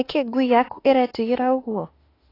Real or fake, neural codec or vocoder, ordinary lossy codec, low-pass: fake; autoencoder, 48 kHz, 32 numbers a frame, DAC-VAE, trained on Japanese speech; AAC, 24 kbps; 5.4 kHz